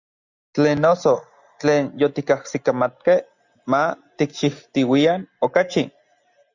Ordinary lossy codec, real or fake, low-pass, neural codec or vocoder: Opus, 64 kbps; real; 7.2 kHz; none